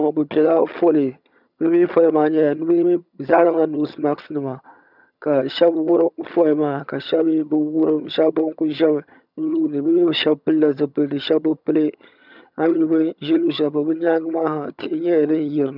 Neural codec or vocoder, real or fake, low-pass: vocoder, 22.05 kHz, 80 mel bands, HiFi-GAN; fake; 5.4 kHz